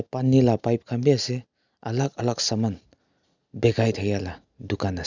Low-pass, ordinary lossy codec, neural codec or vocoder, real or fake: 7.2 kHz; none; none; real